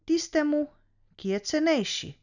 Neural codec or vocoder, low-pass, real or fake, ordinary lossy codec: none; 7.2 kHz; real; none